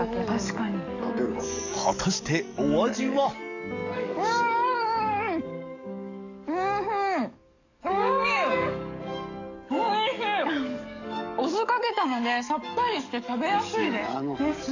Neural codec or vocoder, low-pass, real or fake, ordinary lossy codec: codec, 44.1 kHz, 7.8 kbps, DAC; 7.2 kHz; fake; none